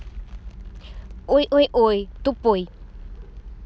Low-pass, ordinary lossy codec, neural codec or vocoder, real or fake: none; none; none; real